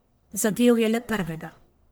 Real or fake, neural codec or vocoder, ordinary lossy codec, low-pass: fake; codec, 44.1 kHz, 1.7 kbps, Pupu-Codec; none; none